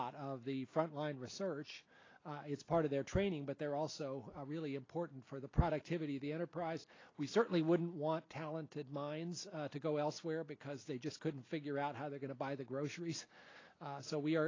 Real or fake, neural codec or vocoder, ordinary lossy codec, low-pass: real; none; AAC, 32 kbps; 7.2 kHz